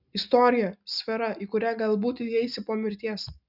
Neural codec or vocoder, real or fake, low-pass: none; real; 5.4 kHz